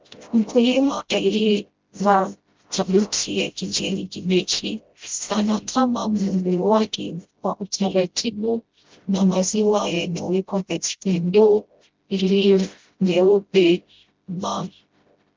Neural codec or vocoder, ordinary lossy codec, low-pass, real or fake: codec, 16 kHz, 0.5 kbps, FreqCodec, smaller model; Opus, 24 kbps; 7.2 kHz; fake